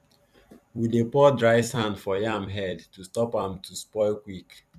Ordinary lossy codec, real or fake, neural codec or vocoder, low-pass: none; fake; vocoder, 44.1 kHz, 128 mel bands every 256 samples, BigVGAN v2; 14.4 kHz